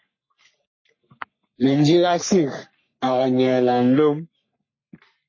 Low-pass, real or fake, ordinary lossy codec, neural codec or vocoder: 7.2 kHz; fake; MP3, 32 kbps; codec, 44.1 kHz, 3.4 kbps, Pupu-Codec